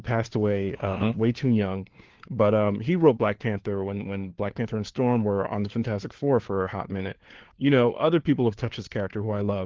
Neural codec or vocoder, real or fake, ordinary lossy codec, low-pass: codec, 16 kHz, 4 kbps, FunCodec, trained on LibriTTS, 50 frames a second; fake; Opus, 16 kbps; 7.2 kHz